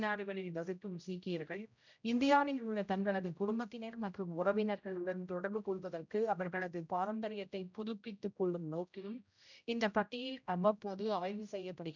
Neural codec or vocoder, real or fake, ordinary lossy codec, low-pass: codec, 16 kHz, 0.5 kbps, X-Codec, HuBERT features, trained on general audio; fake; none; 7.2 kHz